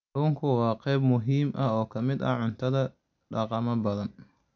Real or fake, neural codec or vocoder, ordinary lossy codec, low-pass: real; none; AAC, 48 kbps; 7.2 kHz